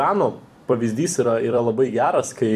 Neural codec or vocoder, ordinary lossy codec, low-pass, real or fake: vocoder, 44.1 kHz, 128 mel bands every 256 samples, BigVGAN v2; AAC, 96 kbps; 14.4 kHz; fake